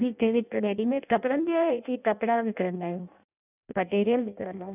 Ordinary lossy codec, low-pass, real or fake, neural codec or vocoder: none; 3.6 kHz; fake; codec, 16 kHz in and 24 kHz out, 0.6 kbps, FireRedTTS-2 codec